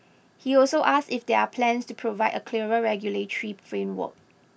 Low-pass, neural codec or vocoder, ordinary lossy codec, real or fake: none; none; none; real